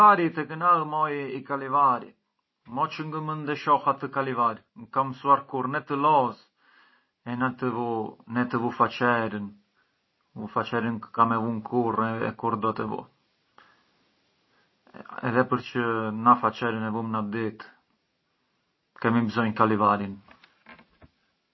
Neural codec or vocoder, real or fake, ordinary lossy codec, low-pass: none; real; MP3, 24 kbps; 7.2 kHz